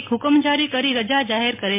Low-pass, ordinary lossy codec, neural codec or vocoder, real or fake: 3.6 kHz; MP3, 24 kbps; none; real